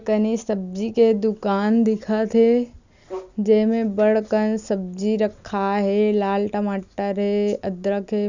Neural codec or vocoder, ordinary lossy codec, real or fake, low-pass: none; none; real; 7.2 kHz